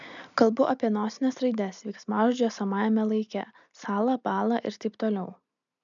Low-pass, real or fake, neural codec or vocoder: 7.2 kHz; real; none